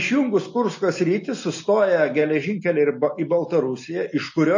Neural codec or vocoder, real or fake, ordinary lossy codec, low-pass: none; real; MP3, 32 kbps; 7.2 kHz